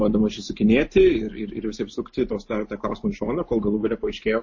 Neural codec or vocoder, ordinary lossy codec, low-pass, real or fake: none; MP3, 32 kbps; 7.2 kHz; real